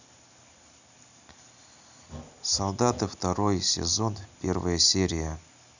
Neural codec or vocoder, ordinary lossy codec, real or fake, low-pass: none; none; real; 7.2 kHz